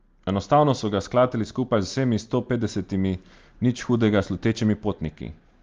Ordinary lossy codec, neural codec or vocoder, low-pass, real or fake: Opus, 32 kbps; none; 7.2 kHz; real